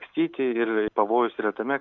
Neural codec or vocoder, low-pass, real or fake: none; 7.2 kHz; real